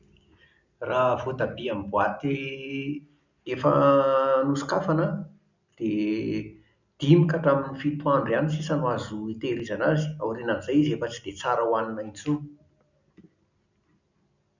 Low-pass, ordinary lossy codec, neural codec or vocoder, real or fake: 7.2 kHz; none; none; real